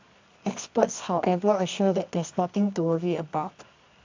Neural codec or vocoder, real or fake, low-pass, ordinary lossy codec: codec, 24 kHz, 0.9 kbps, WavTokenizer, medium music audio release; fake; 7.2 kHz; MP3, 64 kbps